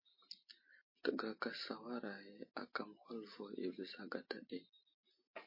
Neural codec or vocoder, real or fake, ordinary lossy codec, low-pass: none; real; MP3, 24 kbps; 5.4 kHz